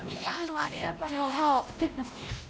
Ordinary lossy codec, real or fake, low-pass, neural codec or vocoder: none; fake; none; codec, 16 kHz, 1 kbps, X-Codec, WavLM features, trained on Multilingual LibriSpeech